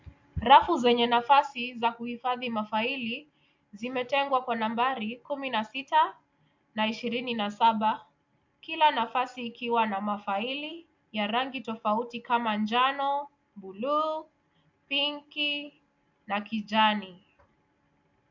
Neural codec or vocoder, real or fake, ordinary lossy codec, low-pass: none; real; MP3, 64 kbps; 7.2 kHz